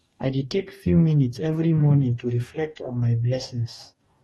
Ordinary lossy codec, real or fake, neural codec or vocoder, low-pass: AAC, 32 kbps; fake; codec, 44.1 kHz, 2.6 kbps, DAC; 19.8 kHz